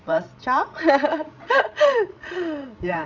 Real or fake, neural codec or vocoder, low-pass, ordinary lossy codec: fake; codec, 16 kHz, 16 kbps, FreqCodec, larger model; 7.2 kHz; none